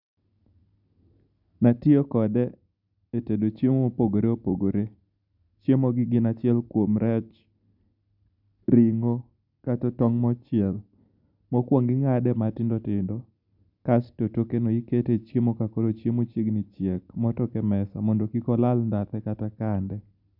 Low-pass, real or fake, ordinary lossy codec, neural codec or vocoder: 5.4 kHz; real; none; none